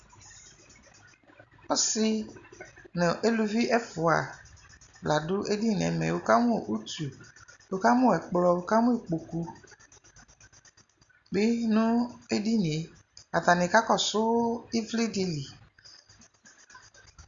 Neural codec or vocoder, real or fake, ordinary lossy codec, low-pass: none; real; Opus, 64 kbps; 7.2 kHz